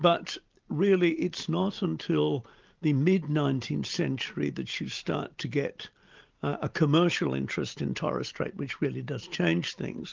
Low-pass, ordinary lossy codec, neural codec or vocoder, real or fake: 7.2 kHz; Opus, 32 kbps; none; real